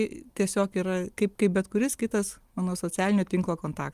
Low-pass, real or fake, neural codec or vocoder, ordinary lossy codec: 14.4 kHz; real; none; Opus, 32 kbps